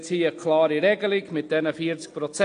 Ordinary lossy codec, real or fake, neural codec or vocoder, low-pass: AAC, 48 kbps; real; none; 9.9 kHz